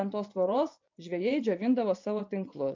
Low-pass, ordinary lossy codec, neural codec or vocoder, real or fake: 7.2 kHz; MP3, 64 kbps; vocoder, 24 kHz, 100 mel bands, Vocos; fake